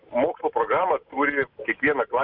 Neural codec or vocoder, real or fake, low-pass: none; real; 5.4 kHz